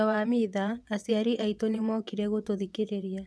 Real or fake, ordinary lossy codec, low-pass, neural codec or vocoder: fake; none; none; vocoder, 22.05 kHz, 80 mel bands, WaveNeXt